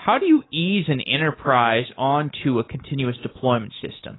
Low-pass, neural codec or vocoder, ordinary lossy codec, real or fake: 7.2 kHz; codec, 24 kHz, 3.1 kbps, DualCodec; AAC, 16 kbps; fake